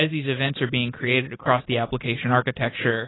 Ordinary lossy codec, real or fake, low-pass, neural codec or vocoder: AAC, 16 kbps; real; 7.2 kHz; none